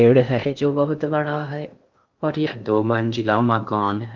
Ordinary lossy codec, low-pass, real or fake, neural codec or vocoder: Opus, 24 kbps; 7.2 kHz; fake; codec, 16 kHz in and 24 kHz out, 0.6 kbps, FocalCodec, streaming, 2048 codes